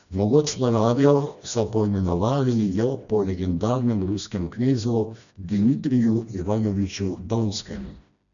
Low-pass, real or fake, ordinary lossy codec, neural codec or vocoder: 7.2 kHz; fake; none; codec, 16 kHz, 1 kbps, FreqCodec, smaller model